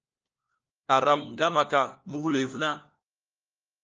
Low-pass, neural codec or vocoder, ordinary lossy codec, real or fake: 7.2 kHz; codec, 16 kHz, 1 kbps, FunCodec, trained on LibriTTS, 50 frames a second; Opus, 24 kbps; fake